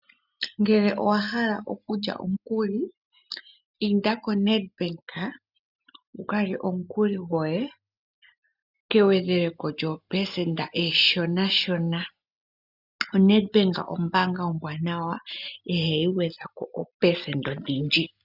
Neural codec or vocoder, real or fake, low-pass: none; real; 5.4 kHz